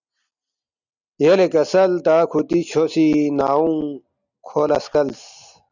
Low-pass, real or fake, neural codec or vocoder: 7.2 kHz; real; none